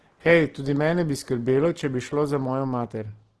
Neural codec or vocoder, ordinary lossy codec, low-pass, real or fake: none; Opus, 16 kbps; 10.8 kHz; real